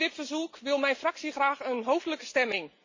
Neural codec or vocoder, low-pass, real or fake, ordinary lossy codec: none; 7.2 kHz; real; MP3, 48 kbps